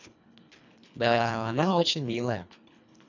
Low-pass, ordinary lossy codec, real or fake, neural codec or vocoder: 7.2 kHz; none; fake; codec, 24 kHz, 1.5 kbps, HILCodec